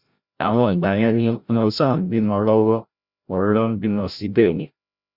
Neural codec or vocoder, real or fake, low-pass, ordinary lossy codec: codec, 16 kHz, 0.5 kbps, FreqCodec, larger model; fake; 5.4 kHz; Opus, 64 kbps